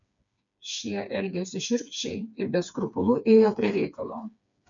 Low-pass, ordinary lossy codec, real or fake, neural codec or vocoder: 7.2 kHz; MP3, 96 kbps; fake; codec, 16 kHz, 4 kbps, FreqCodec, smaller model